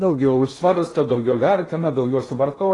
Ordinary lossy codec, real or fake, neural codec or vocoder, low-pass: AAC, 32 kbps; fake; codec, 16 kHz in and 24 kHz out, 0.8 kbps, FocalCodec, streaming, 65536 codes; 10.8 kHz